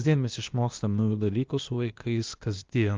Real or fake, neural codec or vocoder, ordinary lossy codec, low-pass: fake; codec, 16 kHz, 0.8 kbps, ZipCodec; Opus, 24 kbps; 7.2 kHz